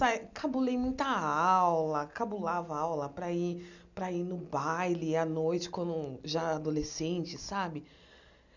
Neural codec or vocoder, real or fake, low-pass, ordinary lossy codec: none; real; 7.2 kHz; none